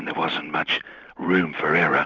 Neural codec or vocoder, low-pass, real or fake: none; 7.2 kHz; real